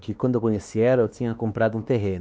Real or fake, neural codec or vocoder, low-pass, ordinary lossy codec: fake; codec, 16 kHz, 2 kbps, X-Codec, WavLM features, trained on Multilingual LibriSpeech; none; none